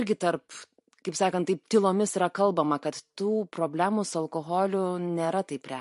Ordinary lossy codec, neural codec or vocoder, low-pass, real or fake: MP3, 48 kbps; vocoder, 44.1 kHz, 128 mel bands every 512 samples, BigVGAN v2; 14.4 kHz; fake